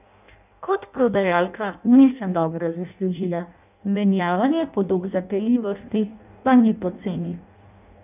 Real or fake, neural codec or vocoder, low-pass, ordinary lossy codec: fake; codec, 16 kHz in and 24 kHz out, 0.6 kbps, FireRedTTS-2 codec; 3.6 kHz; none